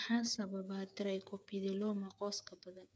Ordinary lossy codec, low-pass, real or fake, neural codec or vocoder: none; none; fake; codec, 16 kHz, 8 kbps, FreqCodec, smaller model